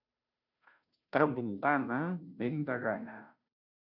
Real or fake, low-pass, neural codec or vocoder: fake; 5.4 kHz; codec, 16 kHz, 0.5 kbps, FunCodec, trained on Chinese and English, 25 frames a second